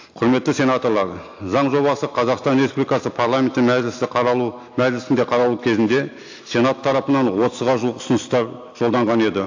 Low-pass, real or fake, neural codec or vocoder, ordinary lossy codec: 7.2 kHz; real; none; AAC, 48 kbps